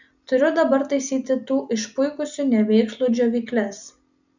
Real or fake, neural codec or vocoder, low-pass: real; none; 7.2 kHz